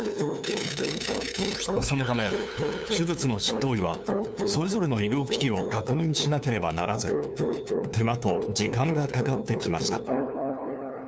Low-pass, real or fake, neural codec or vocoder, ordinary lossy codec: none; fake; codec, 16 kHz, 2 kbps, FunCodec, trained on LibriTTS, 25 frames a second; none